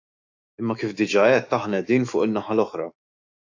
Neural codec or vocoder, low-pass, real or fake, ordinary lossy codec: autoencoder, 48 kHz, 128 numbers a frame, DAC-VAE, trained on Japanese speech; 7.2 kHz; fake; AAC, 48 kbps